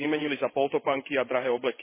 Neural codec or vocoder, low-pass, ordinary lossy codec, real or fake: vocoder, 22.05 kHz, 80 mel bands, WaveNeXt; 3.6 kHz; MP3, 16 kbps; fake